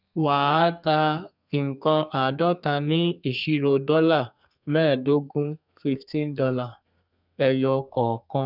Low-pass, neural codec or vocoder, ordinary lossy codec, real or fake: 5.4 kHz; codec, 32 kHz, 1.9 kbps, SNAC; none; fake